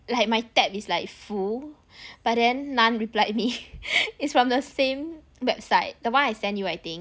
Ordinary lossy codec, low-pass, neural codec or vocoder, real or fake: none; none; none; real